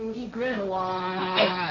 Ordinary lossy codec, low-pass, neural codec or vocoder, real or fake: none; 7.2 kHz; codec, 16 kHz, 1.1 kbps, Voila-Tokenizer; fake